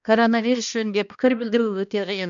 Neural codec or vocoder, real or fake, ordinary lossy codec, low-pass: codec, 16 kHz, 1 kbps, X-Codec, HuBERT features, trained on balanced general audio; fake; none; 7.2 kHz